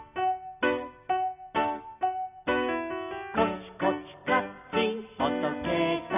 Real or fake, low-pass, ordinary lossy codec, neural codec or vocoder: real; 3.6 kHz; none; none